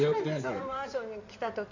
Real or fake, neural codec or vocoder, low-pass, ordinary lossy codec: fake; codec, 16 kHz in and 24 kHz out, 2.2 kbps, FireRedTTS-2 codec; 7.2 kHz; none